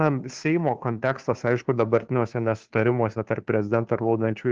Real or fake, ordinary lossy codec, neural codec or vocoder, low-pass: fake; Opus, 16 kbps; codec, 16 kHz, 2 kbps, FunCodec, trained on Chinese and English, 25 frames a second; 7.2 kHz